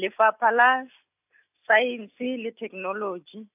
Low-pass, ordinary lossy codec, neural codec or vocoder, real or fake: 3.6 kHz; AAC, 32 kbps; none; real